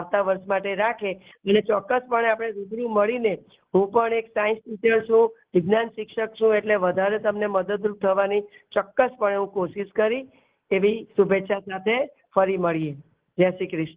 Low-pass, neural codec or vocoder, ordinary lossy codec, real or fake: 3.6 kHz; none; Opus, 16 kbps; real